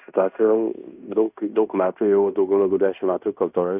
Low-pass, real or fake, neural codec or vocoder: 3.6 kHz; fake; codec, 16 kHz in and 24 kHz out, 0.9 kbps, LongCat-Audio-Codec, fine tuned four codebook decoder